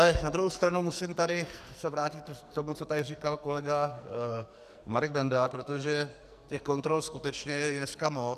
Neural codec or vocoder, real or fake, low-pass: codec, 44.1 kHz, 2.6 kbps, SNAC; fake; 14.4 kHz